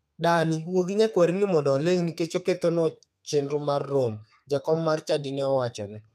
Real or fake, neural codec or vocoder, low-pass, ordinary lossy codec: fake; codec, 32 kHz, 1.9 kbps, SNAC; 14.4 kHz; none